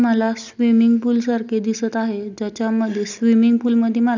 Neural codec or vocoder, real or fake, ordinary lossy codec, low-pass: none; real; none; 7.2 kHz